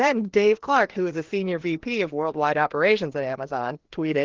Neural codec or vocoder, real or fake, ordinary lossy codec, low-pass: codec, 16 kHz, 2 kbps, FreqCodec, larger model; fake; Opus, 16 kbps; 7.2 kHz